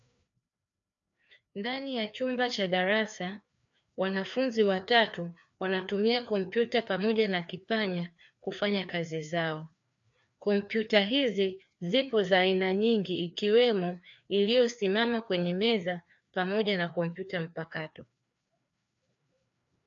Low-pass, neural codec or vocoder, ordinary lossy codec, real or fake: 7.2 kHz; codec, 16 kHz, 2 kbps, FreqCodec, larger model; AAC, 64 kbps; fake